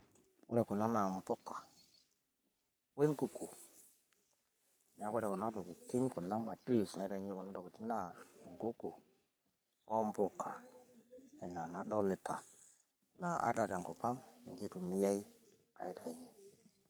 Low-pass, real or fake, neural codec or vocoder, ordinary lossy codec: none; fake; codec, 44.1 kHz, 3.4 kbps, Pupu-Codec; none